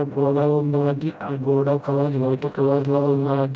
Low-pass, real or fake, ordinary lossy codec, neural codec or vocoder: none; fake; none; codec, 16 kHz, 0.5 kbps, FreqCodec, smaller model